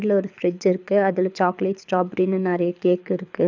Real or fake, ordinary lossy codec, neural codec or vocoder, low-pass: fake; none; codec, 24 kHz, 6 kbps, HILCodec; 7.2 kHz